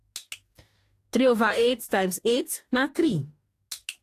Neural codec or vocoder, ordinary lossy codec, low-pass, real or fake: codec, 44.1 kHz, 2.6 kbps, DAC; AAC, 64 kbps; 14.4 kHz; fake